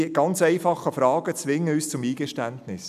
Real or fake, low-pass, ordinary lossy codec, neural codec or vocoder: real; 14.4 kHz; none; none